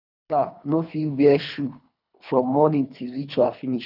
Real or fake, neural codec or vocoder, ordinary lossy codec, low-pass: fake; codec, 24 kHz, 3 kbps, HILCodec; none; 5.4 kHz